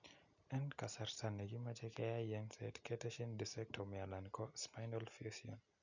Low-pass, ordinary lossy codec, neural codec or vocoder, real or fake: 7.2 kHz; none; none; real